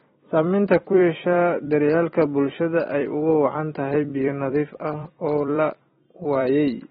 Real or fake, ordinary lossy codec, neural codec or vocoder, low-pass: fake; AAC, 16 kbps; vocoder, 44.1 kHz, 128 mel bands every 256 samples, BigVGAN v2; 19.8 kHz